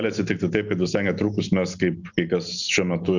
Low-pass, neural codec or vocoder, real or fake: 7.2 kHz; none; real